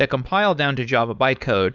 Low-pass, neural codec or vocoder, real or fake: 7.2 kHz; codec, 16 kHz, 4.8 kbps, FACodec; fake